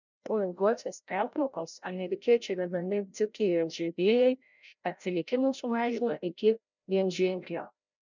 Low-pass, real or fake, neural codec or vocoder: 7.2 kHz; fake; codec, 16 kHz, 0.5 kbps, FreqCodec, larger model